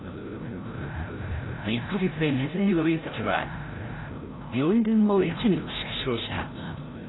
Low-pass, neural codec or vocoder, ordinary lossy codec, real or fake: 7.2 kHz; codec, 16 kHz, 0.5 kbps, FreqCodec, larger model; AAC, 16 kbps; fake